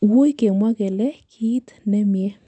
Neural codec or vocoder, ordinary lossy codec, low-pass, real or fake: none; none; 9.9 kHz; real